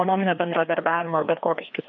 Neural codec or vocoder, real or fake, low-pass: codec, 16 kHz, 2 kbps, FreqCodec, larger model; fake; 7.2 kHz